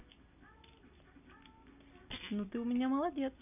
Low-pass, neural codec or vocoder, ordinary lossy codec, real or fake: 3.6 kHz; none; none; real